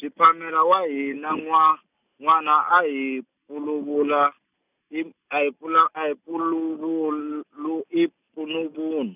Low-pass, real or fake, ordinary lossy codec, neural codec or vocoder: 3.6 kHz; real; none; none